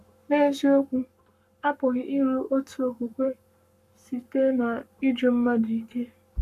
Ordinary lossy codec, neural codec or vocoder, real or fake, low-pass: none; codec, 44.1 kHz, 7.8 kbps, Pupu-Codec; fake; 14.4 kHz